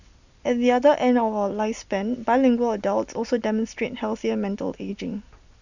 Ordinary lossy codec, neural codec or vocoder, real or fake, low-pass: none; none; real; 7.2 kHz